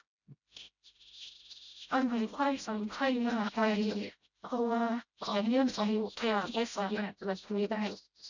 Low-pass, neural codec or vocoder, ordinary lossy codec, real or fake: 7.2 kHz; codec, 16 kHz, 0.5 kbps, FreqCodec, smaller model; none; fake